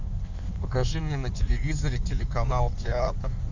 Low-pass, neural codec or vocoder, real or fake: 7.2 kHz; codec, 16 kHz in and 24 kHz out, 1.1 kbps, FireRedTTS-2 codec; fake